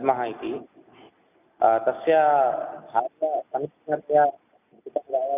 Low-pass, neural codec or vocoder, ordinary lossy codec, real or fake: 3.6 kHz; none; none; real